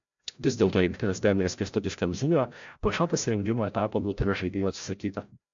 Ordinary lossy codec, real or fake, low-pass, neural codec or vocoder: AAC, 64 kbps; fake; 7.2 kHz; codec, 16 kHz, 0.5 kbps, FreqCodec, larger model